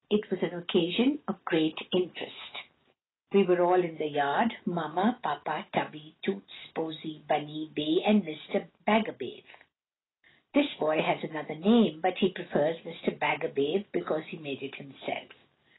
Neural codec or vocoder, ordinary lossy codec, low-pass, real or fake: none; AAC, 16 kbps; 7.2 kHz; real